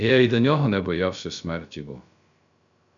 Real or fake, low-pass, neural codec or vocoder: fake; 7.2 kHz; codec, 16 kHz, 0.3 kbps, FocalCodec